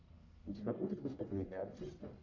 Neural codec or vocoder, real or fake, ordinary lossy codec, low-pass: codec, 44.1 kHz, 1.7 kbps, Pupu-Codec; fake; AAC, 48 kbps; 7.2 kHz